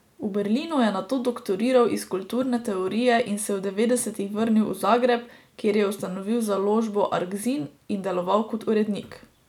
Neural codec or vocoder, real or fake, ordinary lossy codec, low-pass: none; real; none; 19.8 kHz